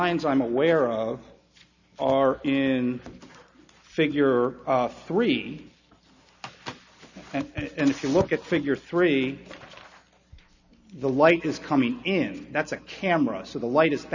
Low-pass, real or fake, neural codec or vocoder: 7.2 kHz; real; none